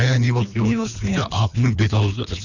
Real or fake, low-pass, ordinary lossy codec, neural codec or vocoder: fake; 7.2 kHz; none; codec, 24 kHz, 3 kbps, HILCodec